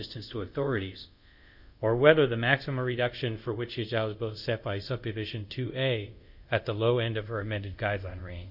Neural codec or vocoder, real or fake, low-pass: codec, 24 kHz, 0.5 kbps, DualCodec; fake; 5.4 kHz